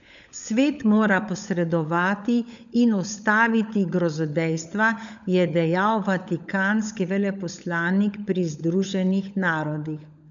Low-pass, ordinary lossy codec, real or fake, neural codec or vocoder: 7.2 kHz; none; fake; codec, 16 kHz, 16 kbps, FunCodec, trained on LibriTTS, 50 frames a second